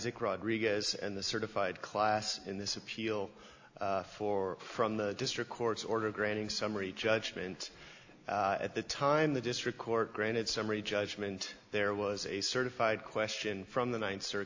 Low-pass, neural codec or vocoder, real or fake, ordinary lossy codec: 7.2 kHz; none; real; AAC, 48 kbps